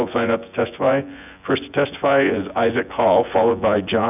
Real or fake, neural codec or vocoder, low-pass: fake; vocoder, 24 kHz, 100 mel bands, Vocos; 3.6 kHz